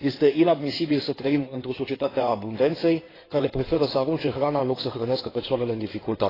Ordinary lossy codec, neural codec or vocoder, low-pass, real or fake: AAC, 24 kbps; codec, 16 kHz in and 24 kHz out, 2.2 kbps, FireRedTTS-2 codec; 5.4 kHz; fake